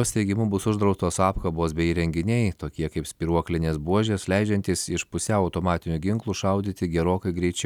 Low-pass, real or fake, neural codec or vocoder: 19.8 kHz; real; none